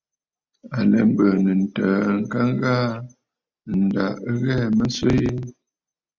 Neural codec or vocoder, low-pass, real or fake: none; 7.2 kHz; real